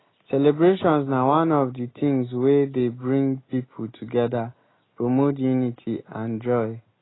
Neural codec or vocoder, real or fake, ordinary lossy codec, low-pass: none; real; AAC, 16 kbps; 7.2 kHz